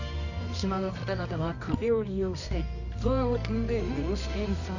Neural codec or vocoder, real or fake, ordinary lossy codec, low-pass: codec, 24 kHz, 0.9 kbps, WavTokenizer, medium music audio release; fake; none; 7.2 kHz